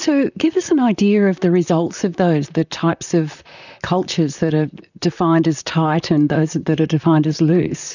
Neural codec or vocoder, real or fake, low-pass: vocoder, 44.1 kHz, 128 mel bands, Pupu-Vocoder; fake; 7.2 kHz